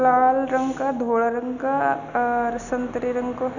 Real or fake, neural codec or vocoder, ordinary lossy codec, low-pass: real; none; none; 7.2 kHz